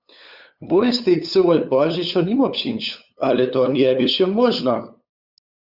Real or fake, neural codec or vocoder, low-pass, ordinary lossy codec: fake; codec, 16 kHz, 8 kbps, FunCodec, trained on LibriTTS, 25 frames a second; 5.4 kHz; Opus, 64 kbps